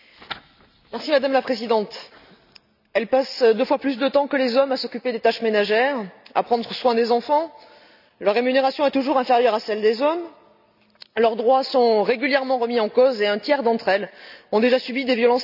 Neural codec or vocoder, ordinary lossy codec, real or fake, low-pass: none; none; real; 5.4 kHz